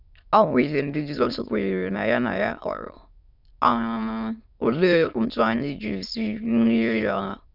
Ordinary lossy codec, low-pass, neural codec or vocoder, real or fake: none; 5.4 kHz; autoencoder, 22.05 kHz, a latent of 192 numbers a frame, VITS, trained on many speakers; fake